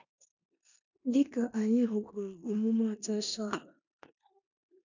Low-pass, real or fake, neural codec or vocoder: 7.2 kHz; fake; codec, 16 kHz in and 24 kHz out, 0.9 kbps, LongCat-Audio-Codec, four codebook decoder